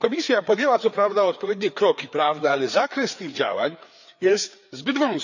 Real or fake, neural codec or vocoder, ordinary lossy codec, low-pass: fake; codec, 16 kHz, 4 kbps, FreqCodec, larger model; none; 7.2 kHz